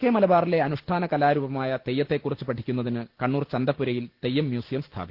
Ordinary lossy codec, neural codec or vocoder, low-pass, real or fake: Opus, 16 kbps; none; 5.4 kHz; real